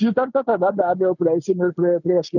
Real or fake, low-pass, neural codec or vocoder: real; 7.2 kHz; none